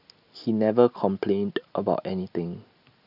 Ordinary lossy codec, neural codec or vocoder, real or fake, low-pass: none; none; real; 5.4 kHz